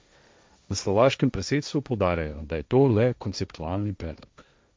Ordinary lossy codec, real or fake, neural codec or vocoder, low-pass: none; fake; codec, 16 kHz, 1.1 kbps, Voila-Tokenizer; none